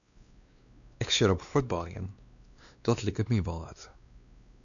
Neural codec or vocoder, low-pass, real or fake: codec, 16 kHz, 2 kbps, X-Codec, WavLM features, trained on Multilingual LibriSpeech; 7.2 kHz; fake